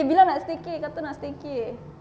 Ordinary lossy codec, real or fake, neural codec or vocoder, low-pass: none; real; none; none